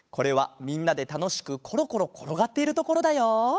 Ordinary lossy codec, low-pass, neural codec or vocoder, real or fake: none; none; codec, 16 kHz, 8 kbps, FunCodec, trained on Chinese and English, 25 frames a second; fake